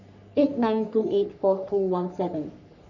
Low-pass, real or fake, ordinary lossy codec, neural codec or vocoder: 7.2 kHz; fake; none; codec, 44.1 kHz, 3.4 kbps, Pupu-Codec